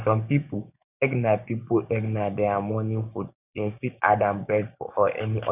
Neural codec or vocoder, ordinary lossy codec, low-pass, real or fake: codec, 16 kHz, 6 kbps, DAC; none; 3.6 kHz; fake